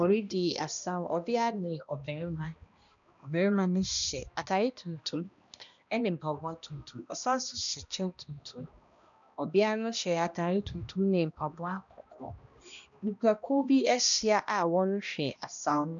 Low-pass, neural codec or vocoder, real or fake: 7.2 kHz; codec, 16 kHz, 1 kbps, X-Codec, HuBERT features, trained on balanced general audio; fake